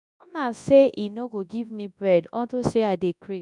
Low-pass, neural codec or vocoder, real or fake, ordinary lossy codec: 10.8 kHz; codec, 24 kHz, 0.9 kbps, WavTokenizer, large speech release; fake; none